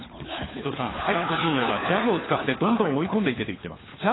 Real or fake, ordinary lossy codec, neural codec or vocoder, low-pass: fake; AAC, 16 kbps; codec, 16 kHz, 4 kbps, FunCodec, trained on LibriTTS, 50 frames a second; 7.2 kHz